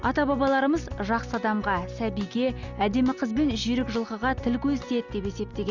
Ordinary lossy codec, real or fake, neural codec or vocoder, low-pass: none; real; none; 7.2 kHz